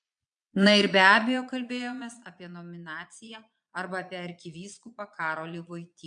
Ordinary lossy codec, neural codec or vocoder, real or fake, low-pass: MP3, 64 kbps; vocoder, 22.05 kHz, 80 mel bands, Vocos; fake; 9.9 kHz